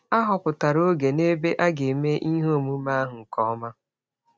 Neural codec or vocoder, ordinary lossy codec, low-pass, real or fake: none; none; none; real